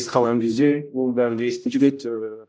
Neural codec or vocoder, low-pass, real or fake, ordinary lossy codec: codec, 16 kHz, 0.5 kbps, X-Codec, HuBERT features, trained on general audio; none; fake; none